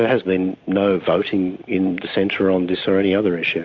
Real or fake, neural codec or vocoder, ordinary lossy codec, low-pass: real; none; AAC, 48 kbps; 7.2 kHz